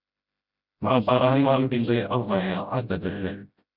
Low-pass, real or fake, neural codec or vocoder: 5.4 kHz; fake; codec, 16 kHz, 0.5 kbps, FreqCodec, smaller model